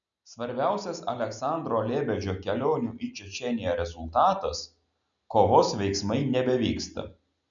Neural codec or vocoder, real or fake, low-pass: none; real; 7.2 kHz